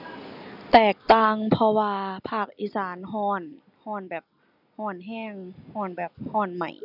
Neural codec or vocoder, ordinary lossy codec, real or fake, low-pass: none; none; real; 5.4 kHz